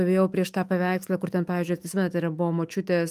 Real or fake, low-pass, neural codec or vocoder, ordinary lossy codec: fake; 14.4 kHz; autoencoder, 48 kHz, 128 numbers a frame, DAC-VAE, trained on Japanese speech; Opus, 32 kbps